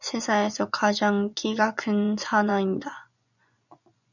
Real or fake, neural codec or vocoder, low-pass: fake; vocoder, 24 kHz, 100 mel bands, Vocos; 7.2 kHz